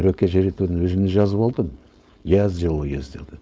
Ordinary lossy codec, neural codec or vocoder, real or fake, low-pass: none; codec, 16 kHz, 4.8 kbps, FACodec; fake; none